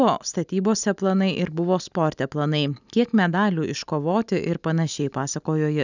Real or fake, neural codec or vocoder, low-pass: real; none; 7.2 kHz